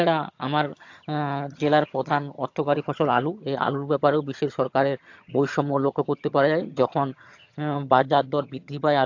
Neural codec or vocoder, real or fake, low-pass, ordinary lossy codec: vocoder, 22.05 kHz, 80 mel bands, HiFi-GAN; fake; 7.2 kHz; AAC, 48 kbps